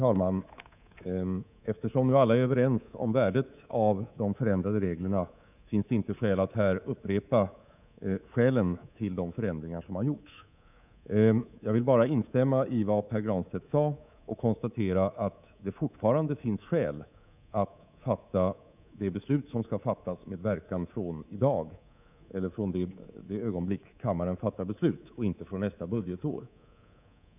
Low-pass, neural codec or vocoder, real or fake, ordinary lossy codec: 3.6 kHz; codec, 24 kHz, 3.1 kbps, DualCodec; fake; none